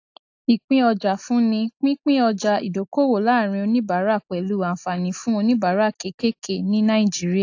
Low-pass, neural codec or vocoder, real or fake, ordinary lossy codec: 7.2 kHz; none; real; AAC, 48 kbps